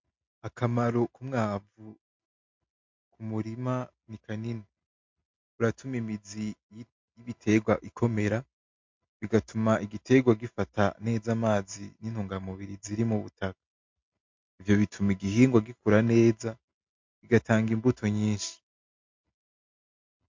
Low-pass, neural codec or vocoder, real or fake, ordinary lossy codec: 7.2 kHz; none; real; MP3, 48 kbps